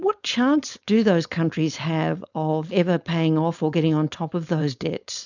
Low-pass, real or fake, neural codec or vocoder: 7.2 kHz; fake; codec, 16 kHz, 4.8 kbps, FACodec